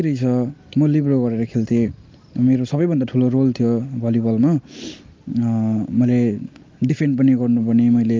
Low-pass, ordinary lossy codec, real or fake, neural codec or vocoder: 7.2 kHz; Opus, 32 kbps; real; none